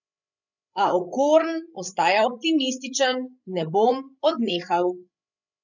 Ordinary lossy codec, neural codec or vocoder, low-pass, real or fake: none; codec, 16 kHz, 16 kbps, FreqCodec, larger model; 7.2 kHz; fake